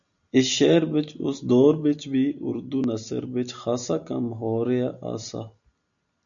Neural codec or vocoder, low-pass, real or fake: none; 7.2 kHz; real